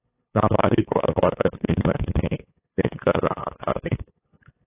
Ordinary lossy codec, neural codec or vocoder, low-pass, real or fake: AAC, 16 kbps; codec, 16 kHz, 8 kbps, FunCodec, trained on LibriTTS, 25 frames a second; 3.6 kHz; fake